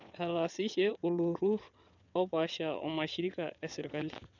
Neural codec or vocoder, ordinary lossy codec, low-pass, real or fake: vocoder, 22.05 kHz, 80 mel bands, WaveNeXt; none; 7.2 kHz; fake